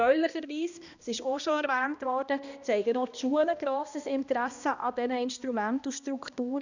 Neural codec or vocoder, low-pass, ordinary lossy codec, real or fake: codec, 16 kHz, 1 kbps, X-Codec, HuBERT features, trained on balanced general audio; 7.2 kHz; none; fake